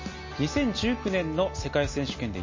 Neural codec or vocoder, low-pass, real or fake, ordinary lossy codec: none; 7.2 kHz; real; MP3, 32 kbps